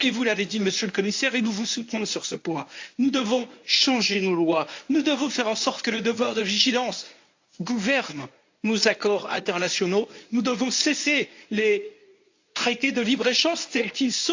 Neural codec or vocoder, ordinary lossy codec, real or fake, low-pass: codec, 24 kHz, 0.9 kbps, WavTokenizer, medium speech release version 1; AAC, 48 kbps; fake; 7.2 kHz